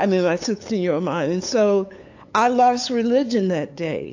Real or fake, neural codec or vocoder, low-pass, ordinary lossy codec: fake; codec, 16 kHz, 8 kbps, FunCodec, trained on LibriTTS, 25 frames a second; 7.2 kHz; AAC, 48 kbps